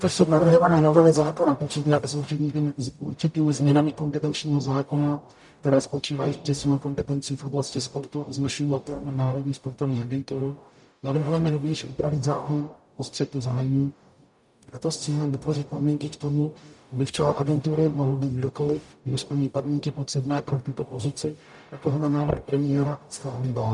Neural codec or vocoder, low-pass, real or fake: codec, 44.1 kHz, 0.9 kbps, DAC; 10.8 kHz; fake